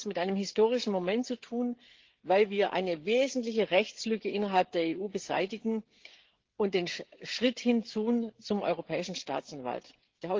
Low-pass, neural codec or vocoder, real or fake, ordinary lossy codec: 7.2 kHz; codec, 16 kHz, 16 kbps, FreqCodec, smaller model; fake; Opus, 16 kbps